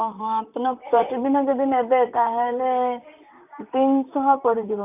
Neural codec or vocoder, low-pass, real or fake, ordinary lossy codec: vocoder, 44.1 kHz, 128 mel bands, Pupu-Vocoder; 3.6 kHz; fake; none